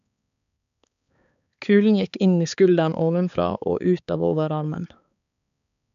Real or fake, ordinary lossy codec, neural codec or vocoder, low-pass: fake; none; codec, 16 kHz, 4 kbps, X-Codec, HuBERT features, trained on balanced general audio; 7.2 kHz